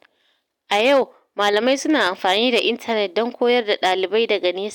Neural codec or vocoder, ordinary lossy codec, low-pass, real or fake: none; none; 19.8 kHz; real